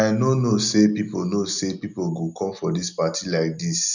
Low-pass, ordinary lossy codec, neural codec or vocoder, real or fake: 7.2 kHz; none; none; real